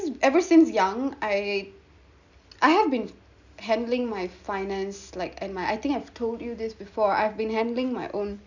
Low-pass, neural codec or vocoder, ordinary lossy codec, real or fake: 7.2 kHz; none; none; real